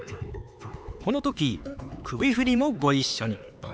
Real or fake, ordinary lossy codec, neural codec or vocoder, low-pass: fake; none; codec, 16 kHz, 4 kbps, X-Codec, HuBERT features, trained on LibriSpeech; none